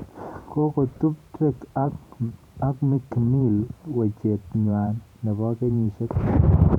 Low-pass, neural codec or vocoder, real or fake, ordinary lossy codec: 19.8 kHz; vocoder, 44.1 kHz, 128 mel bands every 512 samples, BigVGAN v2; fake; none